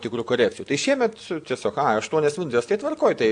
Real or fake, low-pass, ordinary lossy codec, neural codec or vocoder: real; 9.9 kHz; AAC, 64 kbps; none